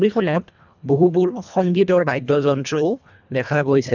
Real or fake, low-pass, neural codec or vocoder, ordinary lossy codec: fake; 7.2 kHz; codec, 24 kHz, 1.5 kbps, HILCodec; none